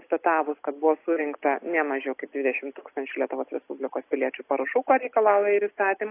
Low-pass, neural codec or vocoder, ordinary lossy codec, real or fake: 3.6 kHz; none; AAC, 24 kbps; real